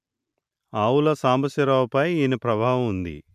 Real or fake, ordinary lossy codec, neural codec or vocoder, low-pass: real; none; none; 14.4 kHz